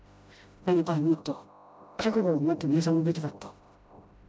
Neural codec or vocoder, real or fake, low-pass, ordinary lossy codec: codec, 16 kHz, 0.5 kbps, FreqCodec, smaller model; fake; none; none